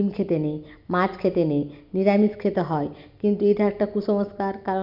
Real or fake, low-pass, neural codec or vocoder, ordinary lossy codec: real; 5.4 kHz; none; none